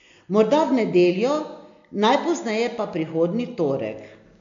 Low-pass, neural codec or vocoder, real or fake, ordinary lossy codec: 7.2 kHz; none; real; AAC, 64 kbps